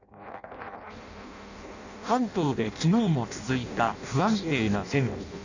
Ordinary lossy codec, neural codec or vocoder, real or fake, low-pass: none; codec, 16 kHz in and 24 kHz out, 0.6 kbps, FireRedTTS-2 codec; fake; 7.2 kHz